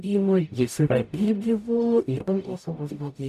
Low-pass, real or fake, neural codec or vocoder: 14.4 kHz; fake; codec, 44.1 kHz, 0.9 kbps, DAC